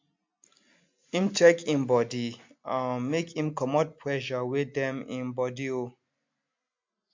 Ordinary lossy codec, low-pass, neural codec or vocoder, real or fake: MP3, 64 kbps; 7.2 kHz; none; real